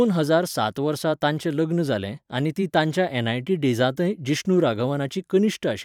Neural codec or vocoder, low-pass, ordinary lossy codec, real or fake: vocoder, 44.1 kHz, 128 mel bands every 512 samples, BigVGAN v2; 19.8 kHz; none; fake